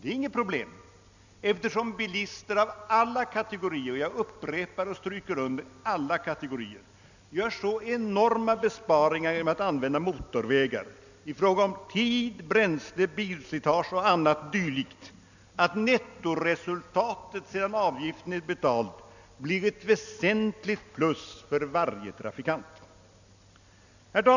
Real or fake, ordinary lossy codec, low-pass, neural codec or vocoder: real; none; 7.2 kHz; none